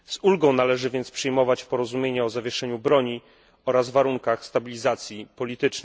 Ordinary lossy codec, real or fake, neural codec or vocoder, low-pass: none; real; none; none